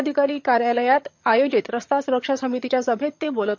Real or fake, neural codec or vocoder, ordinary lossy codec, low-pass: fake; vocoder, 22.05 kHz, 80 mel bands, HiFi-GAN; MP3, 48 kbps; 7.2 kHz